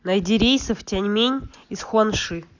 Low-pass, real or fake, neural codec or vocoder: 7.2 kHz; real; none